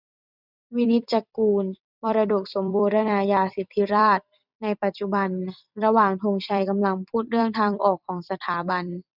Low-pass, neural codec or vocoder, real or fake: 5.4 kHz; vocoder, 44.1 kHz, 128 mel bands every 256 samples, BigVGAN v2; fake